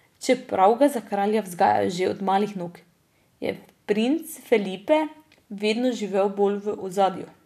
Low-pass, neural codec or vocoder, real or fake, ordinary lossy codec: 14.4 kHz; none; real; none